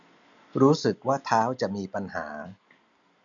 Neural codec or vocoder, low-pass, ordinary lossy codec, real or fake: none; 7.2 kHz; none; real